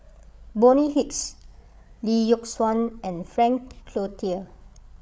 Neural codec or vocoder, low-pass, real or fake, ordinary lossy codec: codec, 16 kHz, 8 kbps, FreqCodec, larger model; none; fake; none